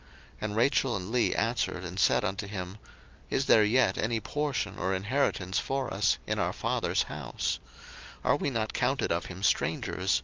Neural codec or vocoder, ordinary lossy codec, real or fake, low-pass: none; Opus, 24 kbps; real; 7.2 kHz